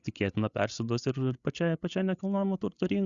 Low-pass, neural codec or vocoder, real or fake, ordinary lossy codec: 7.2 kHz; codec, 16 kHz, 8 kbps, FreqCodec, larger model; fake; Opus, 64 kbps